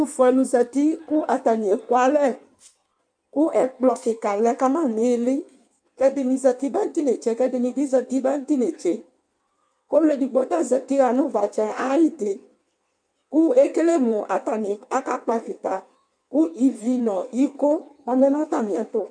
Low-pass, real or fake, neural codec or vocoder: 9.9 kHz; fake; codec, 16 kHz in and 24 kHz out, 1.1 kbps, FireRedTTS-2 codec